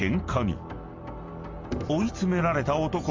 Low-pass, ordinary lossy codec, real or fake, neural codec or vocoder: 7.2 kHz; Opus, 32 kbps; real; none